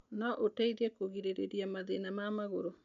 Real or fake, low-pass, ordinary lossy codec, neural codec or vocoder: real; 7.2 kHz; none; none